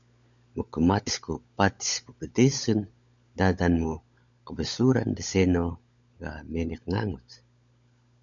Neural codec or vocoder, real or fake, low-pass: codec, 16 kHz, 16 kbps, FunCodec, trained on LibriTTS, 50 frames a second; fake; 7.2 kHz